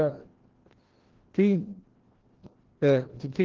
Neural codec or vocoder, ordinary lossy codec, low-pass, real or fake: codec, 16 kHz, 1 kbps, FreqCodec, larger model; Opus, 16 kbps; 7.2 kHz; fake